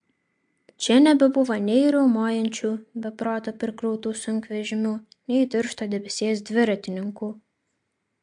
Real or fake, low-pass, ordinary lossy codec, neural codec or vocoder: real; 9.9 kHz; MP3, 64 kbps; none